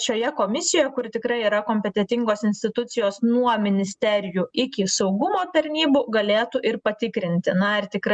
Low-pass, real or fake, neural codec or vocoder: 9.9 kHz; real; none